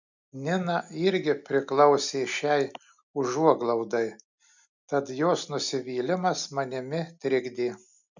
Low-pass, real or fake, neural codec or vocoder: 7.2 kHz; real; none